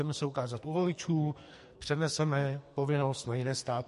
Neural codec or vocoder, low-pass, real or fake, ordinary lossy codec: codec, 44.1 kHz, 2.6 kbps, SNAC; 14.4 kHz; fake; MP3, 48 kbps